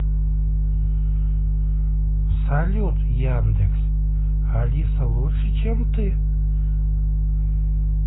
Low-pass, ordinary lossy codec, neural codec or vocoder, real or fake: 7.2 kHz; AAC, 16 kbps; none; real